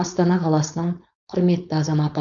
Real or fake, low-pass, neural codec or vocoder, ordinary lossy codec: fake; 7.2 kHz; codec, 16 kHz, 4.8 kbps, FACodec; none